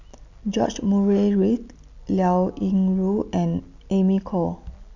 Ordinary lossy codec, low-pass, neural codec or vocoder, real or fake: none; 7.2 kHz; none; real